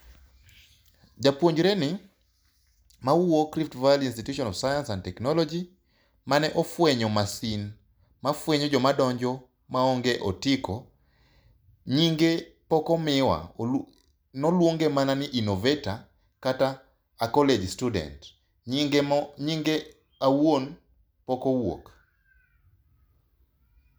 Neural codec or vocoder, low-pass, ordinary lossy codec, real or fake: none; none; none; real